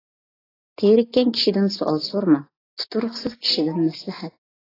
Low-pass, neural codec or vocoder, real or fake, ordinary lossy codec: 5.4 kHz; none; real; AAC, 24 kbps